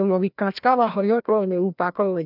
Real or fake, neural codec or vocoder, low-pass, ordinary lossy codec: fake; codec, 16 kHz, 1 kbps, FreqCodec, larger model; 5.4 kHz; none